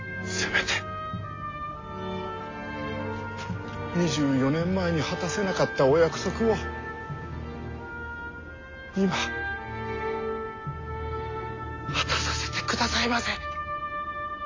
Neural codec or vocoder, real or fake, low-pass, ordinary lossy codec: none; real; 7.2 kHz; AAC, 32 kbps